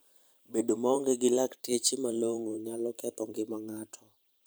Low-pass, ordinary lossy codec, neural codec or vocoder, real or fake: none; none; vocoder, 44.1 kHz, 128 mel bands every 512 samples, BigVGAN v2; fake